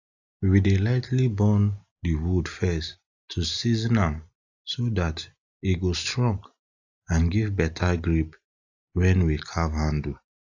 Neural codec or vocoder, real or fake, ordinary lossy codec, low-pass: none; real; AAC, 48 kbps; 7.2 kHz